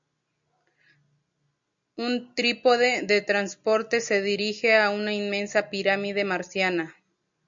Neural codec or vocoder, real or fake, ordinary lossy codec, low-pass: none; real; MP3, 64 kbps; 7.2 kHz